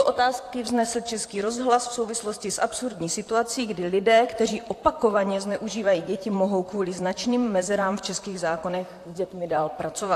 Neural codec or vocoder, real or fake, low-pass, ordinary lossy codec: vocoder, 44.1 kHz, 128 mel bands, Pupu-Vocoder; fake; 14.4 kHz; AAC, 64 kbps